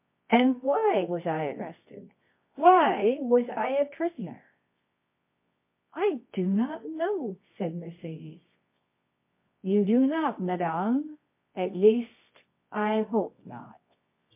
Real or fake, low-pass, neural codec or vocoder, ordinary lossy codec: fake; 3.6 kHz; codec, 24 kHz, 0.9 kbps, WavTokenizer, medium music audio release; MP3, 24 kbps